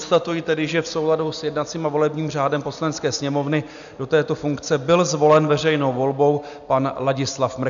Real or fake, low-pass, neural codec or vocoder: real; 7.2 kHz; none